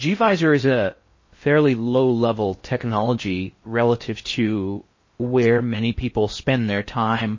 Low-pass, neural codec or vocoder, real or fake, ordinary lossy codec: 7.2 kHz; codec, 16 kHz in and 24 kHz out, 0.6 kbps, FocalCodec, streaming, 4096 codes; fake; MP3, 32 kbps